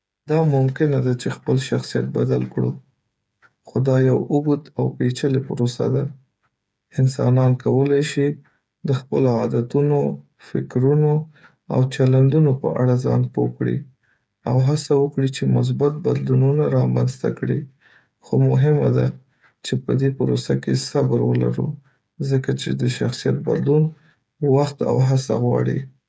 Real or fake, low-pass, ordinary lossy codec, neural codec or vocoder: fake; none; none; codec, 16 kHz, 8 kbps, FreqCodec, smaller model